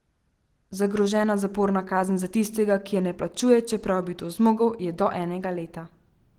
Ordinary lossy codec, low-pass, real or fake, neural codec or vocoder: Opus, 16 kbps; 19.8 kHz; real; none